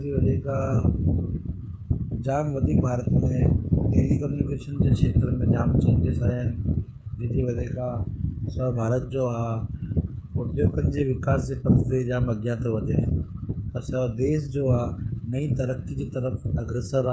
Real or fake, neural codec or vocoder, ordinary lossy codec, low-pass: fake; codec, 16 kHz, 8 kbps, FreqCodec, smaller model; none; none